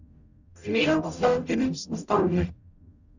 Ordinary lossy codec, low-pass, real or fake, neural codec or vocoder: none; 7.2 kHz; fake; codec, 44.1 kHz, 0.9 kbps, DAC